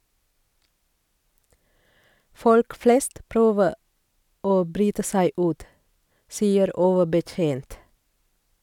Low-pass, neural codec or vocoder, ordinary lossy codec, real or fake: 19.8 kHz; none; none; real